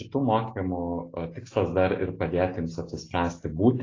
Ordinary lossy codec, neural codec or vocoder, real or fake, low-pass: AAC, 32 kbps; none; real; 7.2 kHz